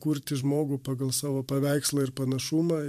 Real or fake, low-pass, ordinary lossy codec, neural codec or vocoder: fake; 14.4 kHz; MP3, 96 kbps; vocoder, 44.1 kHz, 128 mel bands every 512 samples, BigVGAN v2